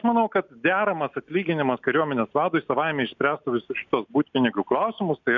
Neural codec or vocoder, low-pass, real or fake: none; 7.2 kHz; real